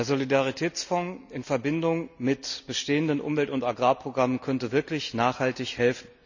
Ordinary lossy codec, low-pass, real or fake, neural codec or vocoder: none; 7.2 kHz; real; none